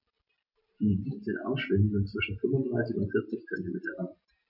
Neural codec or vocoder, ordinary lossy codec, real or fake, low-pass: none; none; real; 5.4 kHz